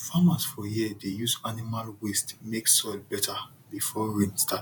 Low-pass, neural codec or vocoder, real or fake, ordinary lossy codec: none; vocoder, 48 kHz, 128 mel bands, Vocos; fake; none